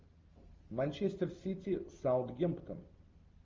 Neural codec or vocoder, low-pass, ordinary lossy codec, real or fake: none; 7.2 kHz; Opus, 32 kbps; real